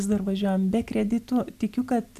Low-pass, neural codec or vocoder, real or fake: 14.4 kHz; none; real